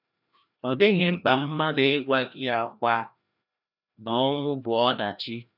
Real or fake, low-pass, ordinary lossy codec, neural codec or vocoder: fake; 5.4 kHz; none; codec, 16 kHz, 1 kbps, FreqCodec, larger model